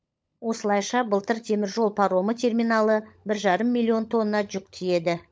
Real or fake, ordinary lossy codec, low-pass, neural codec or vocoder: fake; none; none; codec, 16 kHz, 16 kbps, FunCodec, trained on LibriTTS, 50 frames a second